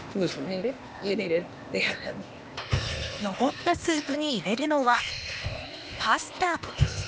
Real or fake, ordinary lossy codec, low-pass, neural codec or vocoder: fake; none; none; codec, 16 kHz, 0.8 kbps, ZipCodec